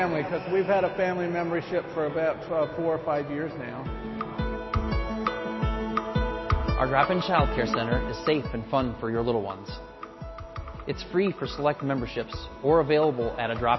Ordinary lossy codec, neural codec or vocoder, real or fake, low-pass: MP3, 24 kbps; none; real; 7.2 kHz